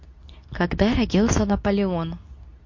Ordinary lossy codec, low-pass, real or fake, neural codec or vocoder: MP3, 48 kbps; 7.2 kHz; fake; codec, 24 kHz, 0.9 kbps, WavTokenizer, medium speech release version 2